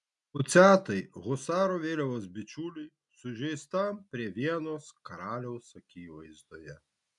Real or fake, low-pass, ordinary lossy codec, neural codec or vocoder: real; 10.8 kHz; MP3, 96 kbps; none